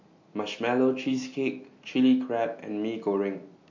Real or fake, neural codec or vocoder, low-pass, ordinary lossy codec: real; none; 7.2 kHz; MP3, 48 kbps